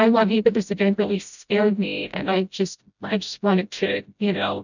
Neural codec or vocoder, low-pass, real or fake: codec, 16 kHz, 0.5 kbps, FreqCodec, smaller model; 7.2 kHz; fake